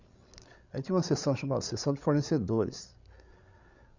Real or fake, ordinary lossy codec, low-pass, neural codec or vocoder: fake; none; 7.2 kHz; codec, 16 kHz, 8 kbps, FreqCodec, larger model